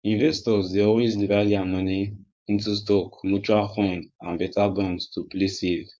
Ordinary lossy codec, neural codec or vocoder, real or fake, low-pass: none; codec, 16 kHz, 4.8 kbps, FACodec; fake; none